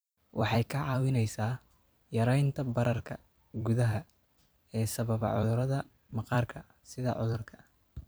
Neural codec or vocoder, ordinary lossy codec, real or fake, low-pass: vocoder, 44.1 kHz, 128 mel bands every 256 samples, BigVGAN v2; none; fake; none